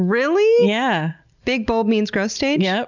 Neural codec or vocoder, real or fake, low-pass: none; real; 7.2 kHz